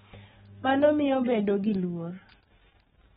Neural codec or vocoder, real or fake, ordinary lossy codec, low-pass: autoencoder, 48 kHz, 128 numbers a frame, DAC-VAE, trained on Japanese speech; fake; AAC, 16 kbps; 19.8 kHz